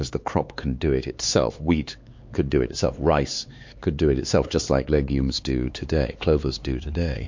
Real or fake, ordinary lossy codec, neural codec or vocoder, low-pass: fake; MP3, 48 kbps; codec, 16 kHz, 4 kbps, X-Codec, HuBERT features, trained on LibriSpeech; 7.2 kHz